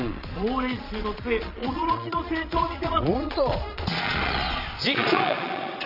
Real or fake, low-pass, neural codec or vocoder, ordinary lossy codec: fake; 5.4 kHz; vocoder, 22.05 kHz, 80 mel bands, Vocos; none